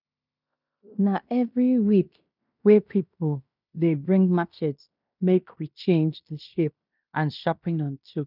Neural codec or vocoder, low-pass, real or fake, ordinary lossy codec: codec, 16 kHz in and 24 kHz out, 0.9 kbps, LongCat-Audio-Codec, fine tuned four codebook decoder; 5.4 kHz; fake; none